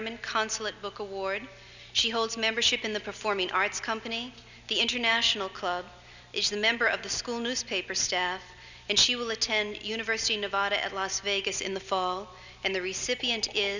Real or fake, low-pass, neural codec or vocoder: real; 7.2 kHz; none